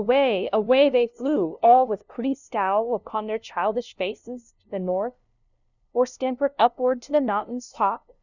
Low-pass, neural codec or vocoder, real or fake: 7.2 kHz; codec, 16 kHz, 0.5 kbps, FunCodec, trained on LibriTTS, 25 frames a second; fake